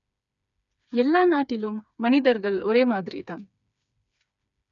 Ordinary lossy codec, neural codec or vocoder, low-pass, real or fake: none; codec, 16 kHz, 4 kbps, FreqCodec, smaller model; 7.2 kHz; fake